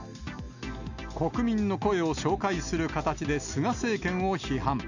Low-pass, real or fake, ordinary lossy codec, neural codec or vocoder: 7.2 kHz; real; none; none